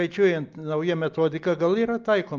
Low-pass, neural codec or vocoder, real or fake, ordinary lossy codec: 7.2 kHz; none; real; Opus, 24 kbps